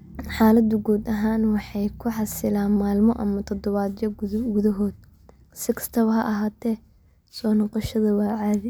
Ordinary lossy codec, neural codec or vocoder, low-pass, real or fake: none; none; none; real